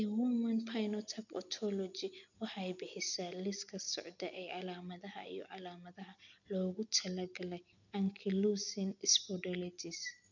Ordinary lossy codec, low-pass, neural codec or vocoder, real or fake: none; 7.2 kHz; none; real